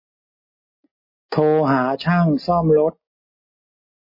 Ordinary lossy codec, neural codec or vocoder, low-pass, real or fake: MP3, 24 kbps; none; 5.4 kHz; real